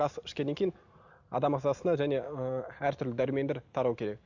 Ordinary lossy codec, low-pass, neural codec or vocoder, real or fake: none; 7.2 kHz; none; real